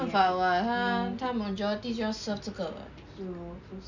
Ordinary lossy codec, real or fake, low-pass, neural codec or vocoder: none; real; 7.2 kHz; none